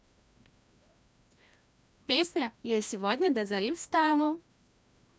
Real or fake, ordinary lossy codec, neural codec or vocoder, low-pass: fake; none; codec, 16 kHz, 1 kbps, FreqCodec, larger model; none